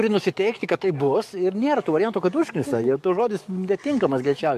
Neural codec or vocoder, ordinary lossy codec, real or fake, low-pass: codec, 44.1 kHz, 7.8 kbps, DAC; MP3, 64 kbps; fake; 14.4 kHz